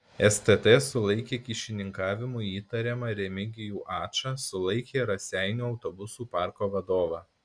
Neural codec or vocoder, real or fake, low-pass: none; real; 9.9 kHz